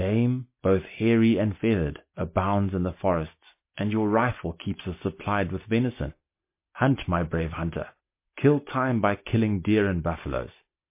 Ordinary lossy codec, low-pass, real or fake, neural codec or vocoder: MP3, 24 kbps; 3.6 kHz; real; none